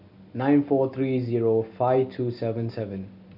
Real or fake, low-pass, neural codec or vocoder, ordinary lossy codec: real; 5.4 kHz; none; none